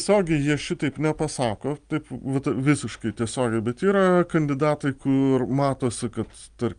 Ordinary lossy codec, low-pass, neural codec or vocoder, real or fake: Opus, 32 kbps; 9.9 kHz; none; real